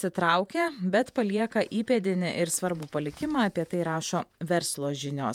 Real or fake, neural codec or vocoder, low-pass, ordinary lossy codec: fake; vocoder, 48 kHz, 128 mel bands, Vocos; 19.8 kHz; MP3, 96 kbps